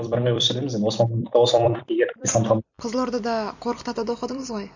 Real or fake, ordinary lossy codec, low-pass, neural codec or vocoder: fake; none; 7.2 kHz; vocoder, 44.1 kHz, 128 mel bands every 256 samples, BigVGAN v2